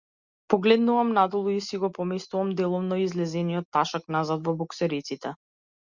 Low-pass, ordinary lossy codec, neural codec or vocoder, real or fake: 7.2 kHz; Opus, 64 kbps; none; real